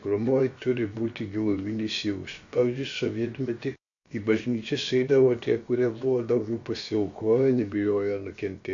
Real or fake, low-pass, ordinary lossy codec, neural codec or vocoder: fake; 7.2 kHz; AAC, 48 kbps; codec, 16 kHz, about 1 kbps, DyCAST, with the encoder's durations